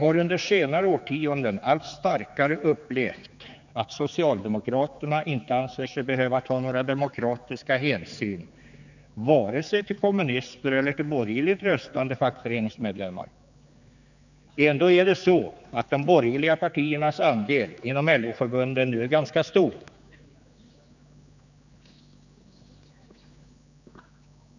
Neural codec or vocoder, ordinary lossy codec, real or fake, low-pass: codec, 16 kHz, 4 kbps, X-Codec, HuBERT features, trained on general audio; none; fake; 7.2 kHz